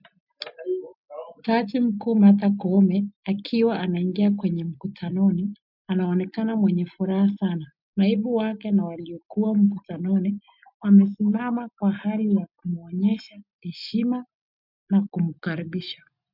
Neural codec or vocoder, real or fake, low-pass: none; real; 5.4 kHz